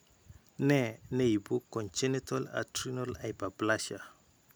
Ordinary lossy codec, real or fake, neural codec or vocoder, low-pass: none; real; none; none